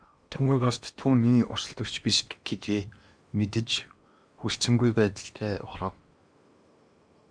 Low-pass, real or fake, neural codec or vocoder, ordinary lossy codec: 9.9 kHz; fake; codec, 16 kHz in and 24 kHz out, 0.8 kbps, FocalCodec, streaming, 65536 codes; Opus, 64 kbps